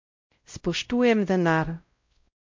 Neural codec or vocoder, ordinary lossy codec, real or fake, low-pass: codec, 16 kHz, 0.5 kbps, X-Codec, WavLM features, trained on Multilingual LibriSpeech; MP3, 48 kbps; fake; 7.2 kHz